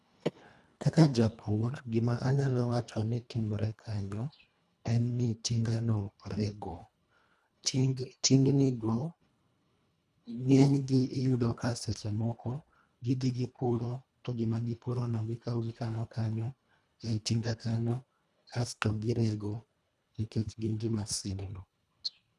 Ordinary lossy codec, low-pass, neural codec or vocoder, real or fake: none; none; codec, 24 kHz, 1.5 kbps, HILCodec; fake